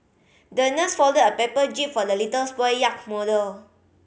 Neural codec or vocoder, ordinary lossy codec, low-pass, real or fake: none; none; none; real